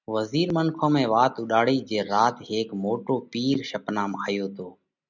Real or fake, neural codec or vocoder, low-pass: real; none; 7.2 kHz